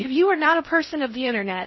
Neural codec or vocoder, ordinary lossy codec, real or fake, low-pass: codec, 16 kHz in and 24 kHz out, 0.6 kbps, FocalCodec, streaming, 2048 codes; MP3, 24 kbps; fake; 7.2 kHz